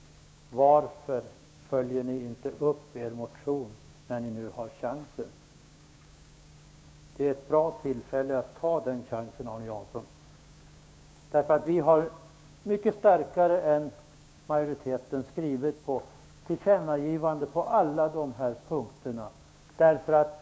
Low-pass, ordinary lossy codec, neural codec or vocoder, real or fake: none; none; codec, 16 kHz, 6 kbps, DAC; fake